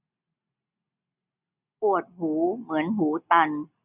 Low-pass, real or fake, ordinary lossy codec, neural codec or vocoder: 3.6 kHz; real; none; none